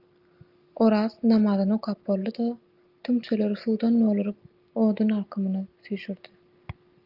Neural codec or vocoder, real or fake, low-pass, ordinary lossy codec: none; real; 5.4 kHz; Opus, 32 kbps